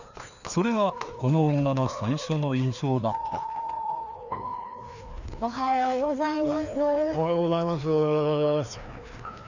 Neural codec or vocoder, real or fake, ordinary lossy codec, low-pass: codec, 16 kHz, 2 kbps, FreqCodec, larger model; fake; none; 7.2 kHz